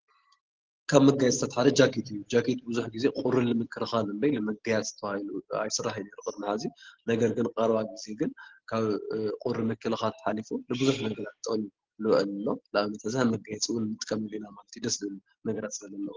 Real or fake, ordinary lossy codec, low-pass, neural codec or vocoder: real; Opus, 16 kbps; 7.2 kHz; none